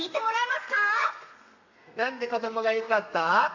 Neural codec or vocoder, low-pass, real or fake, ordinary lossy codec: codec, 32 kHz, 1.9 kbps, SNAC; 7.2 kHz; fake; AAC, 48 kbps